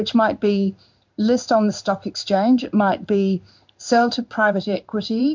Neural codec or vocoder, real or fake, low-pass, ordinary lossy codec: codec, 16 kHz in and 24 kHz out, 1 kbps, XY-Tokenizer; fake; 7.2 kHz; MP3, 64 kbps